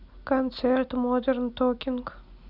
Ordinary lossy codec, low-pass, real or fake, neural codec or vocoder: none; 5.4 kHz; real; none